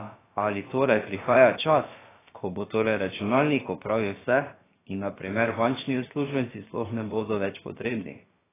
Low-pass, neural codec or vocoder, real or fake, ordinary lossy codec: 3.6 kHz; codec, 16 kHz, about 1 kbps, DyCAST, with the encoder's durations; fake; AAC, 16 kbps